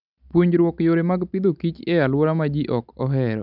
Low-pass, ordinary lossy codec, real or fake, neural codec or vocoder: 5.4 kHz; none; real; none